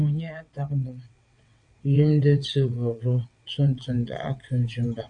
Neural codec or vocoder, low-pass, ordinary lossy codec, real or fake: vocoder, 22.05 kHz, 80 mel bands, WaveNeXt; 9.9 kHz; MP3, 96 kbps; fake